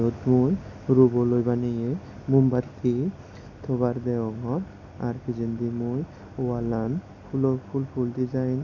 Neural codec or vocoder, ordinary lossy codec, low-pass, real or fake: none; none; 7.2 kHz; real